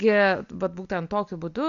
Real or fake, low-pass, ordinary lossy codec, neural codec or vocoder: real; 7.2 kHz; Opus, 64 kbps; none